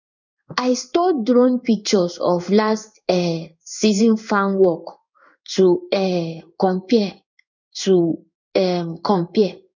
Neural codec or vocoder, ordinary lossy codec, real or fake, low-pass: codec, 16 kHz in and 24 kHz out, 1 kbps, XY-Tokenizer; none; fake; 7.2 kHz